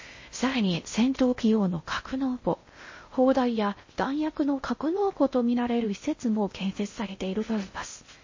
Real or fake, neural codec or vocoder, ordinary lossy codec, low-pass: fake; codec, 16 kHz in and 24 kHz out, 0.6 kbps, FocalCodec, streaming, 4096 codes; MP3, 32 kbps; 7.2 kHz